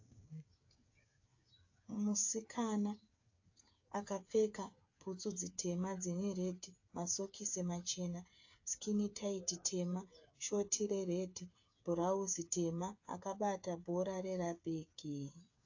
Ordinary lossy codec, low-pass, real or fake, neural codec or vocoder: AAC, 48 kbps; 7.2 kHz; fake; codec, 16 kHz, 8 kbps, FreqCodec, smaller model